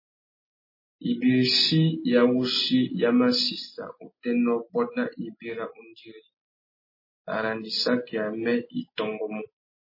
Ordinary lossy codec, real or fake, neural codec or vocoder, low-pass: MP3, 24 kbps; real; none; 5.4 kHz